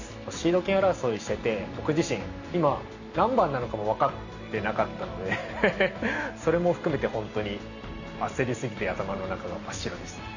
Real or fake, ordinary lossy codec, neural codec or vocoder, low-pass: real; none; none; 7.2 kHz